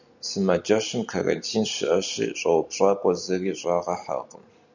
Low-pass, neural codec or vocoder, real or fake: 7.2 kHz; none; real